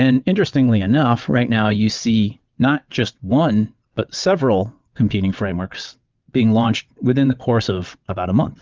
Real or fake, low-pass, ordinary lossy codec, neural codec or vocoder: fake; 7.2 kHz; Opus, 24 kbps; vocoder, 22.05 kHz, 80 mel bands, WaveNeXt